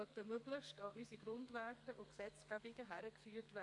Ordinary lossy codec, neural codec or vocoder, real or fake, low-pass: AAC, 64 kbps; codec, 32 kHz, 1.9 kbps, SNAC; fake; 10.8 kHz